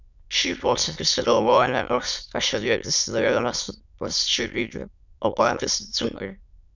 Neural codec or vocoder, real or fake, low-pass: autoencoder, 22.05 kHz, a latent of 192 numbers a frame, VITS, trained on many speakers; fake; 7.2 kHz